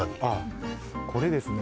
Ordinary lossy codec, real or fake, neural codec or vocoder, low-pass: none; real; none; none